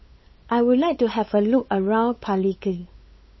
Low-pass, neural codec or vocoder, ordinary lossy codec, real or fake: 7.2 kHz; codec, 16 kHz, 8 kbps, FunCodec, trained on LibriTTS, 25 frames a second; MP3, 24 kbps; fake